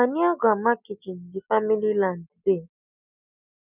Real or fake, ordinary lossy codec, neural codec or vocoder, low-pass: real; none; none; 3.6 kHz